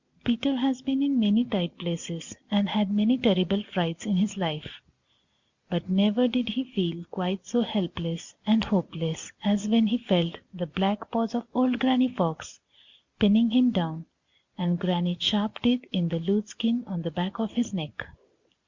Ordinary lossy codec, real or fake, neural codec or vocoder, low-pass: Opus, 64 kbps; real; none; 7.2 kHz